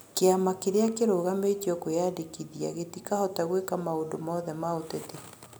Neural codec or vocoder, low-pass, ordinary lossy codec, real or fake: none; none; none; real